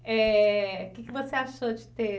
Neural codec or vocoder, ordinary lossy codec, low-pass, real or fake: none; none; none; real